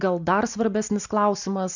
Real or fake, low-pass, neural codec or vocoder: real; 7.2 kHz; none